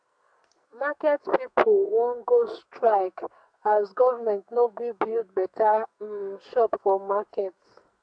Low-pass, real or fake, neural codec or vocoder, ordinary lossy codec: 9.9 kHz; fake; codec, 44.1 kHz, 2.6 kbps, SNAC; AAC, 64 kbps